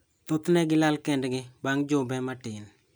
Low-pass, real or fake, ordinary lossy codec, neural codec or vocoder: none; real; none; none